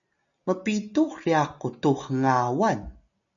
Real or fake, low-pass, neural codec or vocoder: real; 7.2 kHz; none